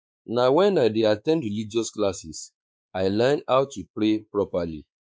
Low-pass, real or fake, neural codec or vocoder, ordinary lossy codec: none; fake; codec, 16 kHz, 4 kbps, X-Codec, WavLM features, trained on Multilingual LibriSpeech; none